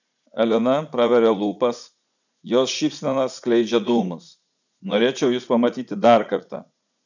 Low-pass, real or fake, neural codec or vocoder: 7.2 kHz; fake; vocoder, 44.1 kHz, 80 mel bands, Vocos